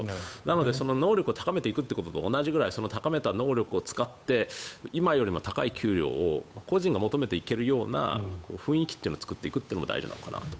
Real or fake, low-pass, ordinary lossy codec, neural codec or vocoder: fake; none; none; codec, 16 kHz, 8 kbps, FunCodec, trained on Chinese and English, 25 frames a second